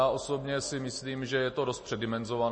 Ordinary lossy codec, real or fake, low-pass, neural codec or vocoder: MP3, 32 kbps; real; 9.9 kHz; none